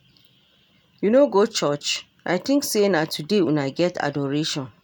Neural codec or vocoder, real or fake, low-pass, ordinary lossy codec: none; real; none; none